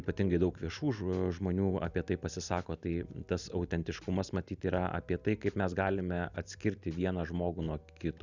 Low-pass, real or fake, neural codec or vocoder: 7.2 kHz; real; none